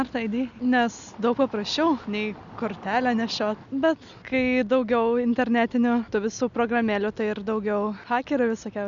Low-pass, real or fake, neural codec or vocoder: 7.2 kHz; real; none